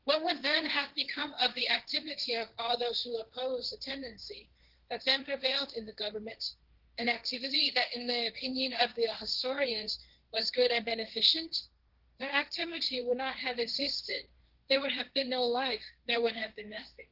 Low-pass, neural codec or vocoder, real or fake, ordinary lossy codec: 5.4 kHz; codec, 16 kHz, 1.1 kbps, Voila-Tokenizer; fake; Opus, 16 kbps